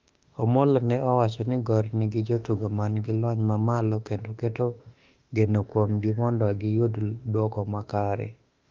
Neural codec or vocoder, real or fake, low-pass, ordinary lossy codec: autoencoder, 48 kHz, 32 numbers a frame, DAC-VAE, trained on Japanese speech; fake; 7.2 kHz; Opus, 24 kbps